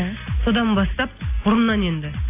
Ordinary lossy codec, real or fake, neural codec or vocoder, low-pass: AAC, 24 kbps; real; none; 3.6 kHz